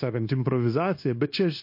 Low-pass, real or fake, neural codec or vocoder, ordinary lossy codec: 5.4 kHz; fake; codec, 16 kHz, 0.9 kbps, LongCat-Audio-Codec; MP3, 32 kbps